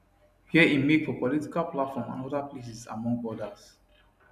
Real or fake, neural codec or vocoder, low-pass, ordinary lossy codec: real; none; 14.4 kHz; none